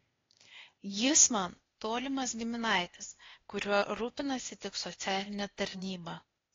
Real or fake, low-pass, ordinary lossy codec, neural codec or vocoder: fake; 7.2 kHz; AAC, 32 kbps; codec, 16 kHz, 0.8 kbps, ZipCodec